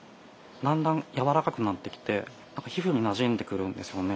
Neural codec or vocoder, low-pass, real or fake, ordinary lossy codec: none; none; real; none